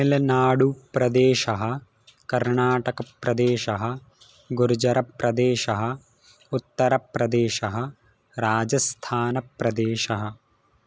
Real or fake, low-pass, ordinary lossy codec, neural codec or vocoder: real; none; none; none